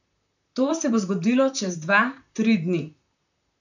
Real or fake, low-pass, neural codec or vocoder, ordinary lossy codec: fake; 7.2 kHz; vocoder, 44.1 kHz, 128 mel bands, Pupu-Vocoder; none